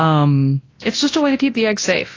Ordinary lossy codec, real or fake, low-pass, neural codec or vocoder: AAC, 32 kbps; fake; 7.2 kHz; codec, 24 kHz, 0.9 kbps, WavTokenizer, large speech release